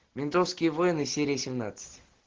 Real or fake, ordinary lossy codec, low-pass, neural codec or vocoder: real; Opus, 16 kbps; 7.2 kHz; none